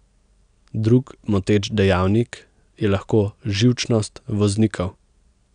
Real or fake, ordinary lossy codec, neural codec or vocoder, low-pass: real; none; none; 9.9 kHz